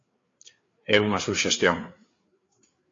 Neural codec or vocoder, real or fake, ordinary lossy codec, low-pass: codec, 16 kHz, 4 kbps, FreqCodec, larger model; fake; AAC, 32 kbps; 7.2 kHz